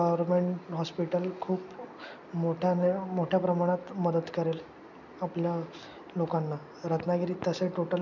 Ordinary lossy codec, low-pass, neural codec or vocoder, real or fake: none; 7.2 kHz; none; real